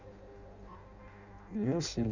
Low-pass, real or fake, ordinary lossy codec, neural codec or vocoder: 7.2 kHz; fake; none; codec, 16 kHz in and 24 kHz out, 0.6 kbps, FireRedTTS-2 codec